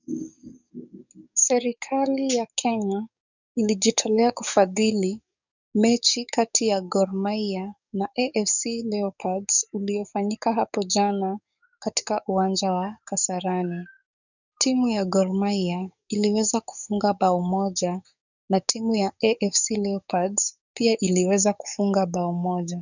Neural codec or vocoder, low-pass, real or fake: codec, 44.1 kHz, 7.8 kbps, DAC; 7.2 kHz; fake